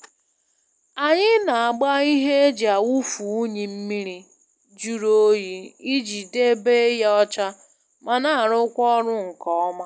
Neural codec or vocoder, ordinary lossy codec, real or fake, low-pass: none; none; real; none